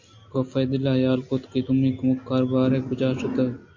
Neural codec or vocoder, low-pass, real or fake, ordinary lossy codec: none; 7.2 kHz; real; MP3, 48 kbps